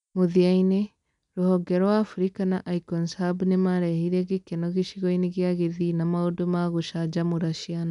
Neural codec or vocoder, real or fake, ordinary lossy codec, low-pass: none; real; none; 10.8 kHz